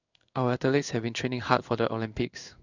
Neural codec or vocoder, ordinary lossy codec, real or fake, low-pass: codec, 16 kHz in and 24 kHz out, 1 kbps, XY-Tokenizer; none; fake; 7.2 kHz